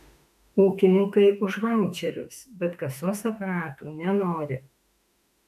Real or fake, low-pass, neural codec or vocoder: fake; 14.4 kHz; autoencoder, 48 kHz, 32 numbers a frame, DAC-VAE, trained on Japanese speech